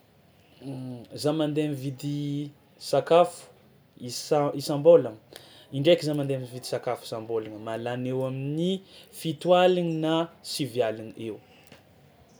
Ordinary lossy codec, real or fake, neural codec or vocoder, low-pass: none; real; none; none